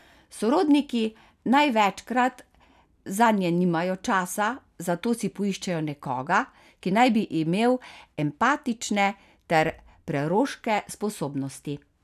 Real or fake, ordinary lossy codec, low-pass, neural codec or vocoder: real; none; 14.4 kHz; none